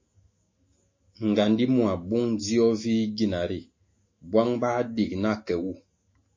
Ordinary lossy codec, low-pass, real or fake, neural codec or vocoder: MP3, 32 kbps; 7.2 kHz; fake; autoencoder, 48 kHz, 128 numbers a frame, DAC-VAE, trained on Japanese speech